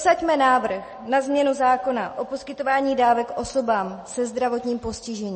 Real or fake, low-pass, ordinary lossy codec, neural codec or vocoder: real; 10.8 kHz; MP3, 32 kbps; none